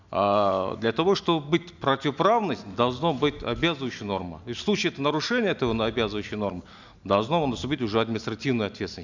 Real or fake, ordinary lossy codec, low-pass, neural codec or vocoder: real; none; 7.2 kHz; none